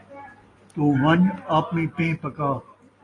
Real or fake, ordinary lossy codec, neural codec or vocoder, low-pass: real; AAC, 32 kbps; none; 10.8 kHz